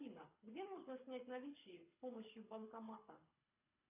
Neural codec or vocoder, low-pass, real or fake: codec, 24 kHz, 6 kbps, HILCodec; 3.6 kHz; fake